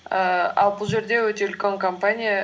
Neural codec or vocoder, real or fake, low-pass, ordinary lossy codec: none; real; none; none